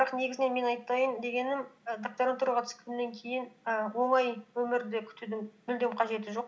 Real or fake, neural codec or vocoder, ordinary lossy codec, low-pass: real; none; none; none